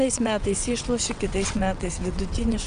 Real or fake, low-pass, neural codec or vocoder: fake; 9.9 kHz; vocoder, 22.05 kHz, 80 mel bands, WaveNeXt